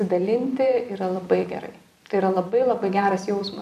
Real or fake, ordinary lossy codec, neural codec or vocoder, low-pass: fake; MP3, 96 kbps; vocoder, 44.1 kHz, 128 mel bands every 256 samples, BigVGAN v2; 14.4 kHz